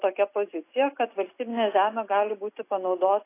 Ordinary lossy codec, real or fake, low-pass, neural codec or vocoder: AAC, 24 kbps; real; 3.6 kHz; none